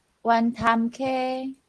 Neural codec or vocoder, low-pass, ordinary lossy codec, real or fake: none; 10.8 kHz; Opus, 16 kbps; real